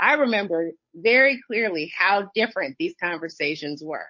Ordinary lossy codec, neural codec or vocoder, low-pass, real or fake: MP3, 32 kbps; codec, 16 kHz, 8 kbps, FunCodec, trained on Chinese and English, 25 frames a second; 7.2 kHz; fake